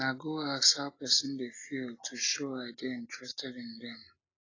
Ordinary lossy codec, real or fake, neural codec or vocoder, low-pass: AAC, 32 kbps; real; none; 7.2 kHz